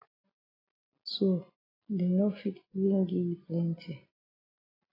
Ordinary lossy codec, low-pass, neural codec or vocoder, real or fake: AAC, 24 kbps; 5.4 kHz; none; real